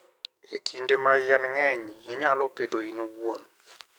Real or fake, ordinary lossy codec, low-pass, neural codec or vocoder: fake; none; none; codec, 44.1 kHz, 2.6 kbps, SNAC